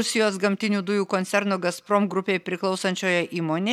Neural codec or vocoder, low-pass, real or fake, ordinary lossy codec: none; 19.8 kHz; real; MP3, 96 kbps